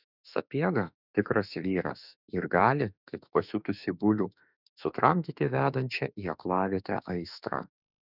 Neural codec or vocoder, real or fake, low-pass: autoencoder, 48 kHz, 32 numbers a frame, DAC-VAE, trained on Japanese speech; fake; 5.4 kHz